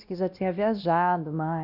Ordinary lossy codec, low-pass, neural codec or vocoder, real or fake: none; 5.4 kHz; codec, 16 kHz, 1 kbps, X-Codec, WavLM features, trained on Multilingual LibriSpeech; fake